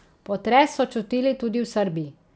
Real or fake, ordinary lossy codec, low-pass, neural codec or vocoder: real; none; none; none